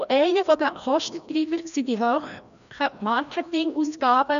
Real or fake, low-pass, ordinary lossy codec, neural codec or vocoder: fake; 7.2 kHz; none; codec, 16 kHz, 1 kbps, FreqCodec, larger model